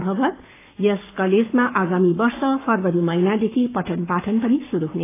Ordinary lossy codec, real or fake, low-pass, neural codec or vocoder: AAC, 24 kbps; fake; 3.6 kHz; codec, 44.1 kHz, 7.8 kbps, Pupu-Codec